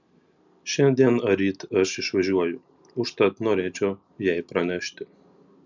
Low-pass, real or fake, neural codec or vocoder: 7.2 kHz; real; none